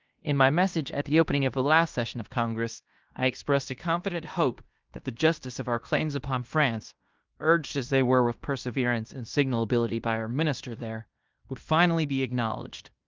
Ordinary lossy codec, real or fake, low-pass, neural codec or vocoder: Opus, 24 kbps; fake; 7.2 kHz; codec, 16 kHz in and 24 kHz out, 0.9 kbps, LongCat-Audio-Codec, fine tuned four codebook decoder